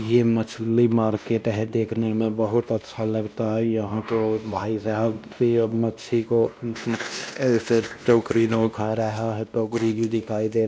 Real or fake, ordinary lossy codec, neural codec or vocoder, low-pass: fake; none; codec, 16 kHz, 1 kbps, X-Codec, WavLM features, trained on Multilingual LibriSpeech; none